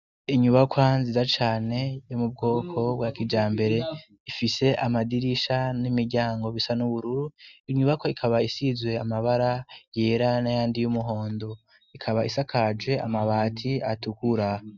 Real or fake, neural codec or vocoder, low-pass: real; none; 7.2 kHz